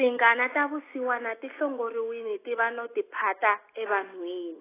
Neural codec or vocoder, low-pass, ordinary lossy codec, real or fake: none; 3.6 kHz; AAC, 16 kbps; real